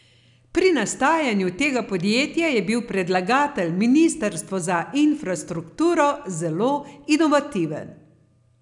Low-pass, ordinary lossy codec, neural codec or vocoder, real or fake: 10.8 kHz; none; none; real